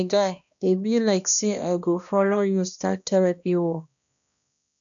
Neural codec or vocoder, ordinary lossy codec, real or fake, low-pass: codec, 16 kHz, 1 kbps, X-Codec, HuBERT features, trained on balanced general audio; none; fake; 7.2 kHz